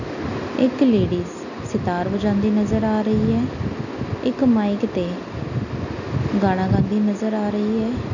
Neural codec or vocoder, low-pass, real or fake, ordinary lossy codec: none; 7.2 kHz; real; none